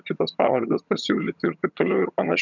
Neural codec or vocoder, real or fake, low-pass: vocoder, 22.05 kHz, 80 mel bands, HiFi-GAN; fake; 7.2 kHz